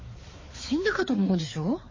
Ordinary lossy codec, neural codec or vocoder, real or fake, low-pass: MP3, 32 kbps; codec, 16 kHz, 4 kbps, X-Codec, HuBERT features, trained on balanced general audio; fake; 7.2 kHz